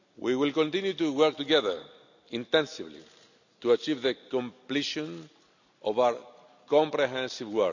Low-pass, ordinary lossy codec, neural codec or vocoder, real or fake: 7.2 kHz; none; none; real